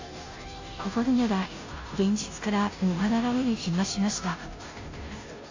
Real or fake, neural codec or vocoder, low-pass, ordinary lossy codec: fake; codec, 16 kHz, 0.5 kbps, FunCodec, trained on Chinese and English, 25 frames a second; 7.2 kHz; AAC, 48 kbps